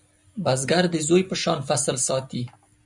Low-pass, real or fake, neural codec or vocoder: 10.8 kHz; real; none